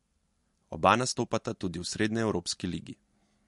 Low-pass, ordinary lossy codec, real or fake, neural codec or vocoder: 14.4 kHz; MP3, 48 kbps; real; none